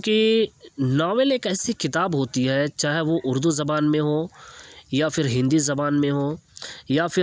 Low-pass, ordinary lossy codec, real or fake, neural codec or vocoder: none; none; real; none